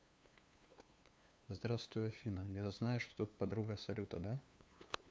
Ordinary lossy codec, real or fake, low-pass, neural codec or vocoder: none; fake; none; codec, 16 kHz, 2 kbps, FunCodec, trained on LibriTTS, 25 frames a second